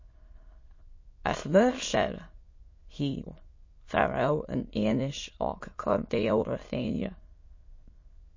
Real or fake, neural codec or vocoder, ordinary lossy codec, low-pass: fake; autoencoder, 22.05 kHz, a latent of 192 numbers a frame, VITS, trained on many speakers; MP3, 32 kbps; 7.2 kHz